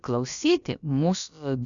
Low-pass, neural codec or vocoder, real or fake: 7.2 kHz; codec, 16 kHz, about 1 kbps, DyCAST, with the encoder's durations; fake